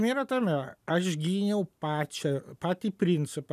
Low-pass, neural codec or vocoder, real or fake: 14.4 kHz; none; real